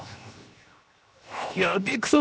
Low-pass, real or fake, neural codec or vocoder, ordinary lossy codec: none; fake; codec, 16 kHz, 0.7 kbps, FocalCodec; none